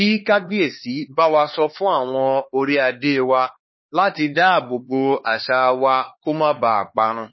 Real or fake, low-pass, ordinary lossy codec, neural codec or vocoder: fake; 7.2 kHz; MP3, 24 kbps; codec, 16 kHz, 4 kbps, X-Codec, HuBERT features, trained on LibriSpeech